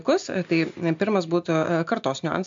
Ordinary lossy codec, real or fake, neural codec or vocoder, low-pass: MP3, 64 kbps; real; none; 7.2 kHz